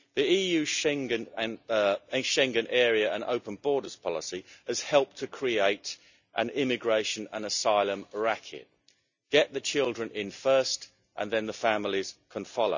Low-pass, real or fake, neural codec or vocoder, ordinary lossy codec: 7.2 kHz; real; none; none